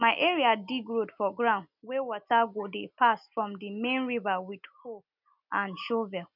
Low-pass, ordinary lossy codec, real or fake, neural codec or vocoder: 5.4 kHz; none; real; none